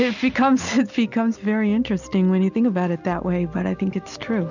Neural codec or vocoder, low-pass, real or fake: none; 7.2 kHz; real